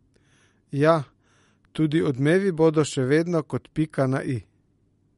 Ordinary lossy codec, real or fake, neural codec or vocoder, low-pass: MP3, 48 kbps; real; none; 19.8 kHz